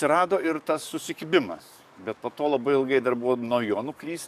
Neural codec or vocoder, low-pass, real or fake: codec, 44.1 kHz, 7.8 kbps, DAC; 14.4 kHz; fake